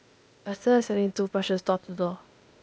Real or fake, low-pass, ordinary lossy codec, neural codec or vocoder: fake; none; none; codec, 16 kHz, 0.8 kbps, ZipCodec